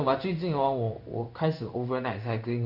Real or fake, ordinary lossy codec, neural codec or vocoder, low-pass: fake; none; codec, 16 kHz in and 24 kHz out, 1 kbps, XY-Tokenizer; 5.4 kHz